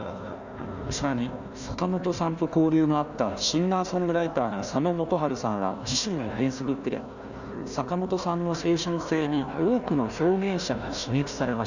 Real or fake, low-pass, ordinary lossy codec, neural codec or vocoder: fake; 7.2 kHz; none; codec, 16 kHz, 1 kbps, FunCodec, trained on Chinese and English, 50 frames a second